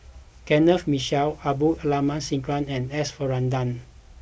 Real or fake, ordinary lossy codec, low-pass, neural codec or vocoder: real; none; none; none